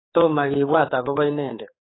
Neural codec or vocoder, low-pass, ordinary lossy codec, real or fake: codec, 16 kHz, 4 kbps, X-Codec, HuBERT features, trained on general audio; 7.2 kHz; AAC, 16 kbps; fake